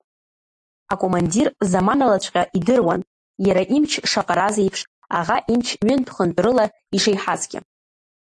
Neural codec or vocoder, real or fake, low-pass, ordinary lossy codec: none; real; 10.8 kHz; AAC, 64 kbps